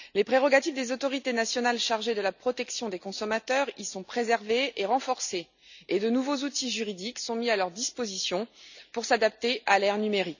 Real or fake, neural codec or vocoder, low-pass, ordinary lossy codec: real; none; 7.2 kHz; none